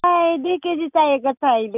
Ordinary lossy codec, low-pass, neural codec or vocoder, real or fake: none; 3.6 kHz; none; real